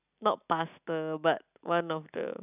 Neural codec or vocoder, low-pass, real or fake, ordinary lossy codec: none; 3.6 kHz; real; none